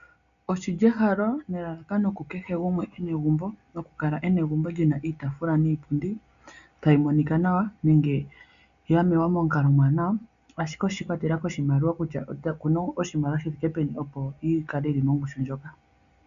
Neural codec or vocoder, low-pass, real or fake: none; 7.2 kHz; real